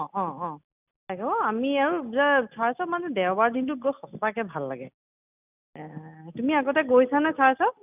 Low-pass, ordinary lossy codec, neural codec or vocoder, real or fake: 3.6 kHz; none; none; real